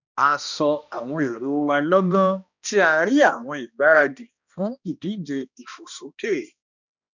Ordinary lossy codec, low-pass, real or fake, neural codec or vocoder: none; 7.2 kHz; fake; codec, 16 kHz, 1 kbps, X-Codec, HuBERT features, trained on balanced general audio